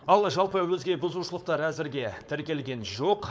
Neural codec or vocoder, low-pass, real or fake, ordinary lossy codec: codec, 16 kHz, 4.8 kbps, FACodec; none; fake; none